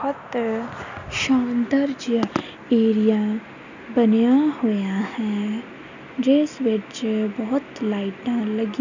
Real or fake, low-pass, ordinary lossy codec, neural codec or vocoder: real; 7.2 kHz; none; none